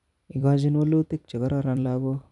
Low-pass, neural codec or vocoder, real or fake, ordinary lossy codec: 10.8 kHz; vocoder, 44.1 kHz, 128 mel bands every 256 samples, BigVGAN v2; fake; none